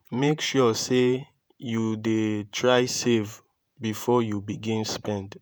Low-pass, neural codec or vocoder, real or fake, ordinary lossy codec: none; vocoder, 48 kHz, 128 mel bands, Vocos; fake; none